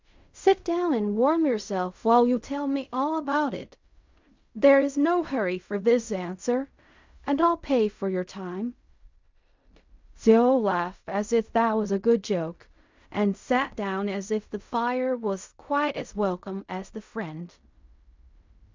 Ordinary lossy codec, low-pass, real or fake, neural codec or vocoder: MP3, 64 kbps; 7.2 kHz; fake; codec, 16 kHz in and 24 kHz out, 0.4 kbps, LongCat-Audio-Codec, fine tuned four codebook decoder